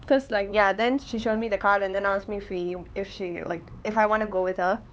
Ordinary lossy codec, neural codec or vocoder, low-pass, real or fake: none; codec, 16 kHz, 4 kbps, X-Codec, HuBERT features, trained on LibriSpeech; none; fake